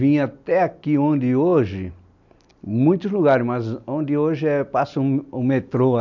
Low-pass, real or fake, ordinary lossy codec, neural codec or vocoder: 7.2 kHz; real; none; none